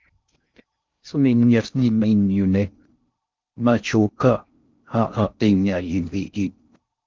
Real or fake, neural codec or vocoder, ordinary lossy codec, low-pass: fake; codec, 16 kHz in and 24 kHz out, 0.6 kbps, FocalCodec, streaming, 4096 codes; Opus, 24 kbps; 7.2 kHz